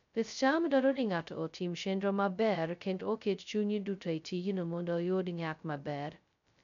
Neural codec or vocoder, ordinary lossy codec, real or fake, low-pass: codec, 16 kHz, 0.2 kbps, FocalCodec; none; fake; 7.2 kHz